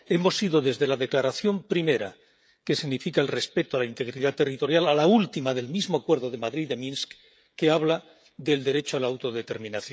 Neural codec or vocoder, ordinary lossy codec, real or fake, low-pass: codec, 16 kHz, 16 kbps, FreqCodec, smaller model; none; fake; none